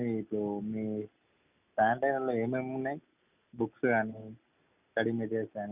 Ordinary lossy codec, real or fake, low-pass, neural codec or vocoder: none; real; 3.6 kHz; none